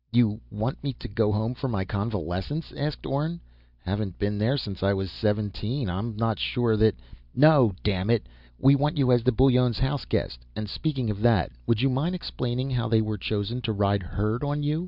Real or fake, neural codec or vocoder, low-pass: real; none; 5.4 kHz